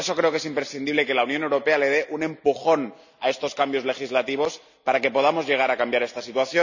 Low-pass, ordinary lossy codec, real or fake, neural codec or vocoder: 7.2 kHz; none; real; none